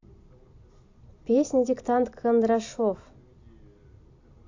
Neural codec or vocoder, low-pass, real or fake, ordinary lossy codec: none; 7.2 kHz; real; AAC, 48 kbps